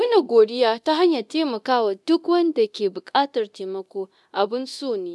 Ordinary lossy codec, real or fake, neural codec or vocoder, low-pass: none; fake; codec, 24 kHz, 0.9 kbps, DualCodec; none